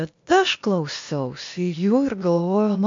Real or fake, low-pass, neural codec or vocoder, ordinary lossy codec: fake; 7.2 kHz; codec, 16 kHz, 0.8 kbps, ZipCodec; MP3, 48 kbps